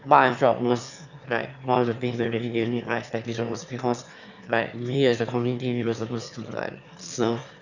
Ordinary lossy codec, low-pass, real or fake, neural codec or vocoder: none; 7.2 kHz; fake; autoencoder, 22.05 kHz, a latent of 192 numbers a frame, VITS, trained on one speaker